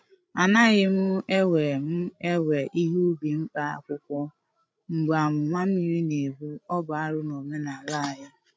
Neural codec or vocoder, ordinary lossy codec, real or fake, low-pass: codec, 16 kHz, 16 kbps, FreqCodec, larger model; none; fake; none